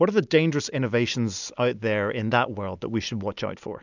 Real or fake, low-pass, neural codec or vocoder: real; 7.2 kHz; none